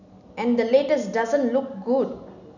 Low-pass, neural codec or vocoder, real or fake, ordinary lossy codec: 7.2 kHz; none; real; none